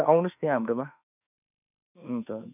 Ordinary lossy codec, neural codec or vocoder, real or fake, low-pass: none; autoencoder, 48 kHz, 32 numbers a frame, DAC-VAE, trained on Japanese speech; fake; 3.6 kHz